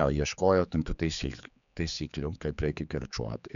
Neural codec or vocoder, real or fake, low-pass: codec, 16 kHz, 4 kbps, X-Codec, HuBERT features, trained on general audio; fake; 7.2 kHz